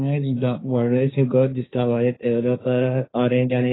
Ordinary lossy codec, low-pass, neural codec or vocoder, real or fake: AAC, 16 kbps; 7.2 kHz; codec, 16 kHz, 1.1 kbps, Voila-Tokenizer; fake